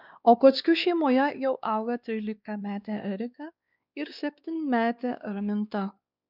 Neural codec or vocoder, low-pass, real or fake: codec, 16 kHz, 2 kbps, X-Codec, WavLM features, trained on Multilingual LibriSpeech; 5.4 kHz; fake